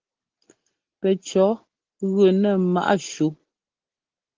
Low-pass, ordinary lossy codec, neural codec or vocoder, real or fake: 7.2 kHz; Opus, 16 kbps; none; real